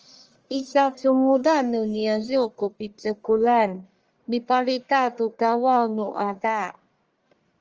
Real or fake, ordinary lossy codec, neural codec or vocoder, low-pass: fake; Opus, 24 kbps; codec, 44.1 kHz, 1.7 kbps, Pupu-Codec; 7.2 kHz